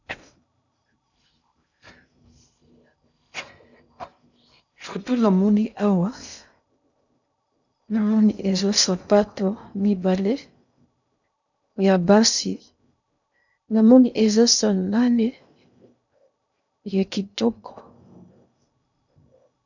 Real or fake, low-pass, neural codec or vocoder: fake; 7.2 kHz; codec, 16 kHz in and 24 kHz out, 0.6 kbps, FocalCodec, streaming, 2048 codes